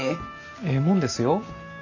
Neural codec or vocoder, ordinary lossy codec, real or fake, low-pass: none; none; real; 7.2 kHz